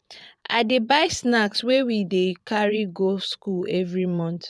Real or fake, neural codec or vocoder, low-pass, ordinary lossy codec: fake; vocoder, 22.05 kHz, 80 mel bands, Vocos; none; none